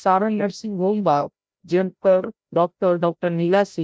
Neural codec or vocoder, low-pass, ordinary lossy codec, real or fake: codec, 16 kHz, 0.5 kbps, FreqCodec, larger model; none; none; fake